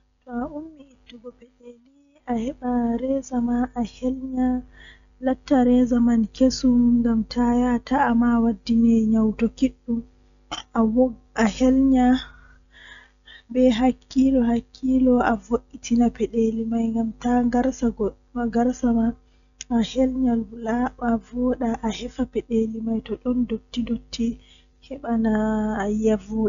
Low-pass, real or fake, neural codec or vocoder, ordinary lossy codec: 7.2 kHz; real; none; none